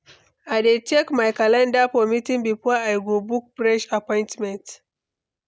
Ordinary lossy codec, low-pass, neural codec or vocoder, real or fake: none; none; none; real